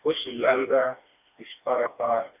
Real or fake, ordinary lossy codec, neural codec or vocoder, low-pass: fake; none; codec, 16 kHz, 2 kbps, FreqCodec, smaller model; 3.6 kHz